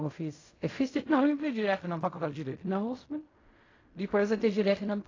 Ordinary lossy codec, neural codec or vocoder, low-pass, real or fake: AAC, 32 kbps; codec, 16 kHz in and 24 kHz out, 0.4 kbps, LongCat-Audio-Codec, fine tuned four codebook decoder; 7.2 kHz; fake